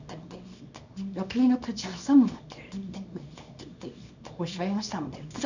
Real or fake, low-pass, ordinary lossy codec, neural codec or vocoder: fake; 7.2 kHz; none; codec, 24 kHz, 0.9 kbps, WavTokenizer, small release